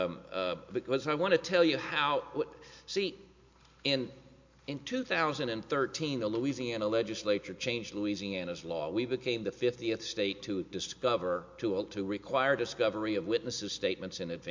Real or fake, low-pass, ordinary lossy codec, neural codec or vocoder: real; 7.2 kHz; MP3, 48 kbps; none